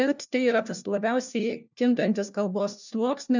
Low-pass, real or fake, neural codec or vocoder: 7.2 kHz; fake; codec, 16 kHz, 1 kbps, FunCodec, trained on LibriTTS, 50 frames a second